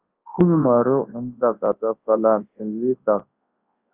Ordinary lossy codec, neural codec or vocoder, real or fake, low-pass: Opus, 32 kbps; codec, 24 kHz, 0.9 kbps, WavTokenizer, large speech release; fake; 3.6 kHz